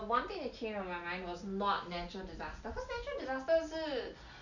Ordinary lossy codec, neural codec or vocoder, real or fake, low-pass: none; none; real; 7.2 kHz